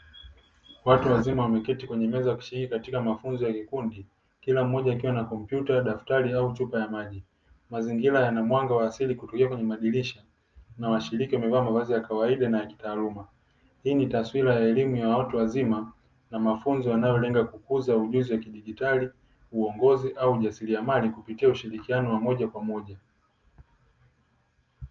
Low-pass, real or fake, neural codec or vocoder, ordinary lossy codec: 7.2 kHz; real; none; Opus, 24 kbps